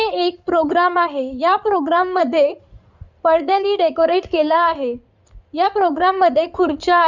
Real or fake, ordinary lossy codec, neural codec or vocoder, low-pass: fake; none; codec, 16 kHz in and 24 kHz out, 2.2 kbps, FireRedTTS-2 codec; 7.2 kHz